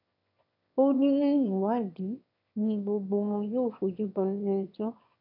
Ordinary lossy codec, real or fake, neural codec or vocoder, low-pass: none; fake; autoencoder, 22.05 kHz, a latent of 192 numbers a frame, VITS, trained on one speaker; 5.4 kHz